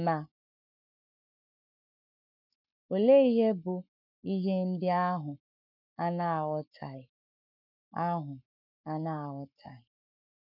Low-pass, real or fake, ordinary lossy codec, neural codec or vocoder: 5.4 kHz; fake; none; codec, 44.1 kHz, 7.8 kbps, Pupu-Codec